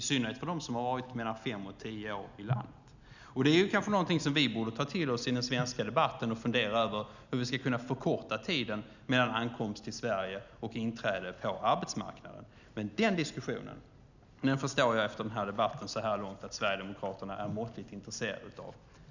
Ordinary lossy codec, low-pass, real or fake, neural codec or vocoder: none; 7.2 kHz; real; none